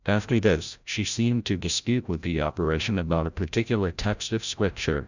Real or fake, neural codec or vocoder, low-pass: fake; codec, 16 kHz, 0.5 kbps, FreqCodec, larger model; 7.2 kHz